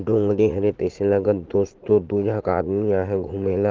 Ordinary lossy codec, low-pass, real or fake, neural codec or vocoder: Opus, 16 kbps; 7.2 kHz; fake; vocoder, 44.1 kHz, 80 mel bands, Vocos